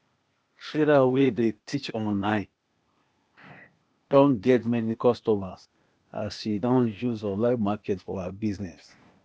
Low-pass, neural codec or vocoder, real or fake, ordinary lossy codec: none; codec, 16 kHz, 0.8 kbps, ZipCodec; fake; none